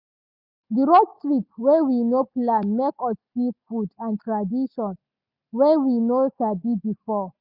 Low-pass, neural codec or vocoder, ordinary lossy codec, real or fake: 5.4 kHz; none; none; real